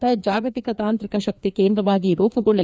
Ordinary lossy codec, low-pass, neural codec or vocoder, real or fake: none; none; codec, 16 kHz, 2 kbps, FreqCodec, larger model; fake